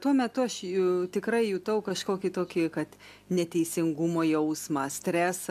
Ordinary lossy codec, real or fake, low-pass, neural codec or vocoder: MP3, 96 kbps; real; 14.4 kHz; none